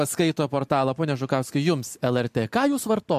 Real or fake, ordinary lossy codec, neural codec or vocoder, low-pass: real; MP3, 64 kbps; none; 14.4 kHz